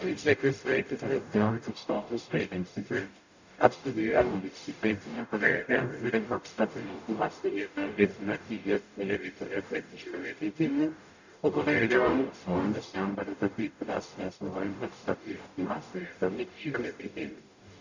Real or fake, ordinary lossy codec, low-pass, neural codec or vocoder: fake; none; 7.2 kHz; codec, 44.1 kHz, 0.9 kbps, DAC